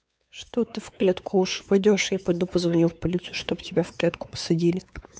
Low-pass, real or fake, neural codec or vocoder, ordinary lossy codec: none; fake; codec, 16 kHz, 4 kbps, X-Codec, WavLM features, trained on Multilingual LibriSpeech; none